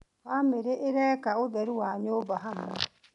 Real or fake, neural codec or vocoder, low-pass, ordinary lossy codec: real; none; 10.8 kHz; none